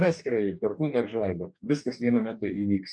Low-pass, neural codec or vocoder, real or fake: 9.9 kHz; codec, 44.1 kHz, 2.6 kbps, DAC; fake